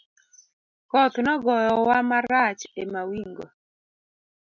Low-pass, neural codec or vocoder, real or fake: 7.2 kHz; none; real